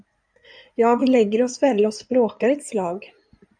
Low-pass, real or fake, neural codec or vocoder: 9.9 kHz; fake; codec, 16 kHz in and 24 kHz out, 2.2 kbps, FireRedTTS-2 codec